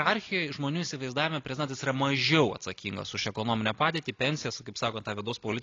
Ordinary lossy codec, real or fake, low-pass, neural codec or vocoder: AAC, 32 kbps; real; 7.2 kHz; none